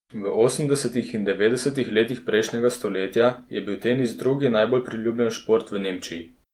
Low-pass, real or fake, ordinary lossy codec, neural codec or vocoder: 19.8 kHz; fake; Opus, 32 kbps; vocoder, 48 kHz, 128 mel bands, Vocos